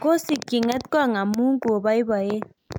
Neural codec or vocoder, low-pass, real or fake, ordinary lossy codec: none; 19.8 kHz; real; none